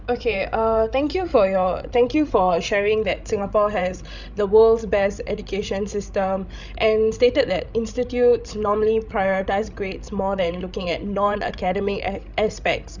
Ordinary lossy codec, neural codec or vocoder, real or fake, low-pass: none; codec, 16 kHz, 16 kbps, FreqCodec, larger model; fake; 7.2 kHz